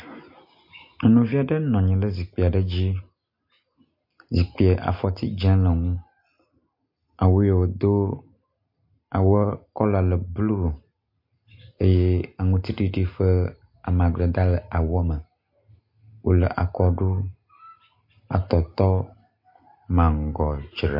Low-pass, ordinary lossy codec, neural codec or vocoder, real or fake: 5.4 kHz; MP3, 32 kbps; none; real